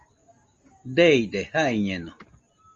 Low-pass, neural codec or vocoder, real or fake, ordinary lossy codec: 7.2 kHz; none; real; Opus, 32 kbps